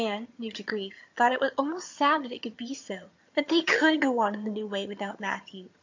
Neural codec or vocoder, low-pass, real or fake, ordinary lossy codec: vocoder, 22.05 kHz, 80 mel bands, HiFi-GAN; 7.2 kHz; fake; MP3, 48 kbps